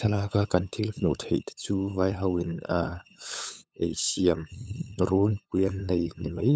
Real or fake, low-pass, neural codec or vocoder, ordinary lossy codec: fake; none; codec, 16 kHz, 8 kbps, FunCodec, trained on LibriTTS, 25 frames a second; none